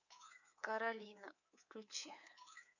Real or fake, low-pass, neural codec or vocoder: fake; 7.2 kHz; codec, 24 kHz, 3.1 kbps, DualCodec